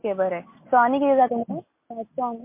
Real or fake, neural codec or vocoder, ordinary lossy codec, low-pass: real; none; MP3, 24 kbps; 3.6 kHz